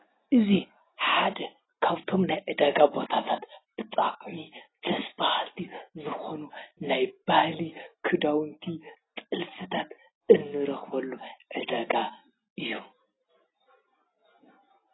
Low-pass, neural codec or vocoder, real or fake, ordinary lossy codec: 7.2 kHz; none; real; AAC, 16 kbps